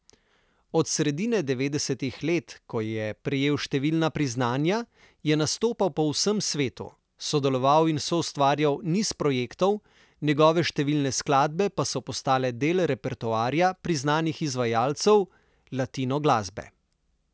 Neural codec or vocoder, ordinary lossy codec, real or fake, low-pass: none; none; real; none